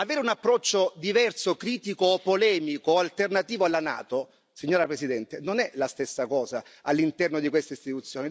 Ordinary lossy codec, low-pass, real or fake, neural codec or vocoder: none; none; real; none